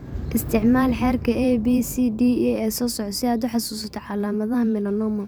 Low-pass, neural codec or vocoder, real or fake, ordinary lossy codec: none; vocoder, 44.1 kHz, 128 mel bands every 512 samples, BigVGAN v2; fake; none